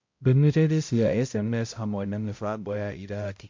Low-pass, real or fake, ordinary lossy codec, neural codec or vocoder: 7.2 kHz; fake; MP3, 48 kbps; codec, 16 kHz, 0.5 kbps, X-Codec, HuBERT features, trained on balanced general audio